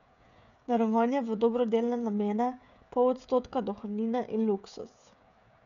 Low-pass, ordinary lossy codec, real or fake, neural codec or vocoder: 7.2 kHz; none; fake; codec, 16 kHz, 16 kbps, FreqCodec, smaller model